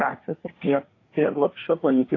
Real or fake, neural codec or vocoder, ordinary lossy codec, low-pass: fake; codec, 24 kHz, 1 kbps, SNAC; AAC, 32 kbps; 7.2 kHz